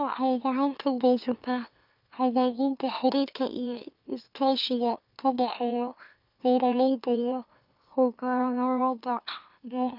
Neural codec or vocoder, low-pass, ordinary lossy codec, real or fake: autoencoder, 44.1 kHz, a latent of 192 numbers a frame, MeloTTS; 5.4 kHz; none; fake